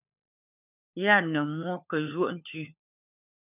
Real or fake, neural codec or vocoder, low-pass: fake; codec, 16 kHz, 4 kbps, FunCodec, trained on LibriTTS, 50 frames a second; 3.6 kHz